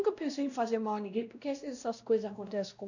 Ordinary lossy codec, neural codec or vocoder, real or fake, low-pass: none; codec, 16 kHz, 1 kbps, X-Codec, WavLM features, trained on Multilingual LibriSpeech; fake; 7.2 kHz